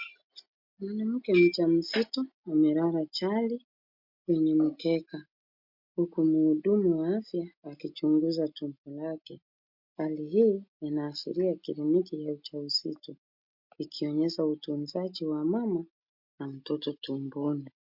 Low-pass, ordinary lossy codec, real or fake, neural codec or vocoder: 5.4 kHz; MP3, 48 kbps; real; none